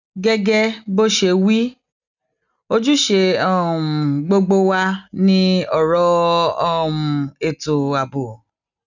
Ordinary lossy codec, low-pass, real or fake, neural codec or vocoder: none; 7.2 kHz; real; none